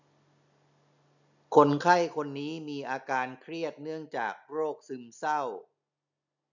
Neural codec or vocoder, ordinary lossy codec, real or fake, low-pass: none; none; real; 7.2 kHz